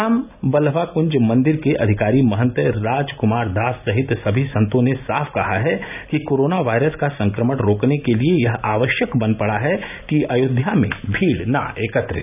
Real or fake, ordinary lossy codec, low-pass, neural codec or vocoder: real; none; 3.6 kHz; none